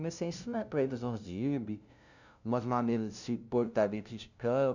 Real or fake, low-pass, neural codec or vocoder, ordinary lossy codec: fake; 7.2 kHz; codec, 16 kHz, 0.5 kbps, FunCodec, trained on LibriTTS, 25 frames a second; none